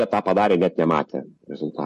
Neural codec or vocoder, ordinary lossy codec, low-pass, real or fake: none; MP3, 48 kbps; 14.4 kHz; real